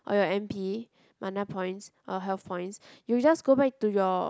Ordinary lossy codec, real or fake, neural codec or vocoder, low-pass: none; real; none; none